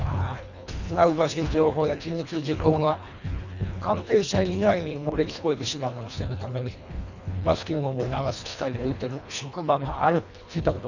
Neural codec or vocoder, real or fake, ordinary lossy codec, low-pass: codec, 24 kHz, 1.5 kbps, HILCodec; fake; none; 7.2 kHz